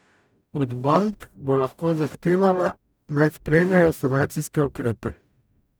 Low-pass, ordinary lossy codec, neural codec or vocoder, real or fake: none; none; codec, 44.1 kHz, 0.9 kbps, DAC; fake